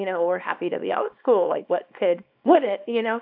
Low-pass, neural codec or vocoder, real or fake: 5.4 kHz; codec, 24 kHz, 0.9 kbps, WavTokenizer, small release; fake